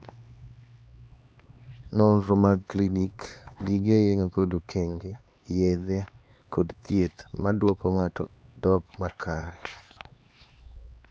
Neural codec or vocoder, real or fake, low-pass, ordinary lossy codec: codec, 16 kHz, 2 kbps, X-Codec, HuBERT features, trained on LibriSpeech; fake; none; none